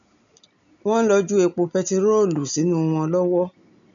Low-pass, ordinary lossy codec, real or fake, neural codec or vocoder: 7.2 kHz; none; real; none